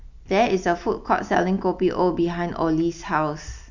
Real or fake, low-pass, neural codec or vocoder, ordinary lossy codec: real; 7.2 kHz; none; none